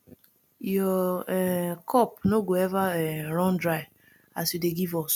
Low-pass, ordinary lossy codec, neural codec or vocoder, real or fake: none; none; none; real